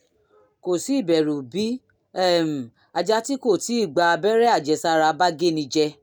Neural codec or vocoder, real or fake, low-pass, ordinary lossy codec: none; real; none; none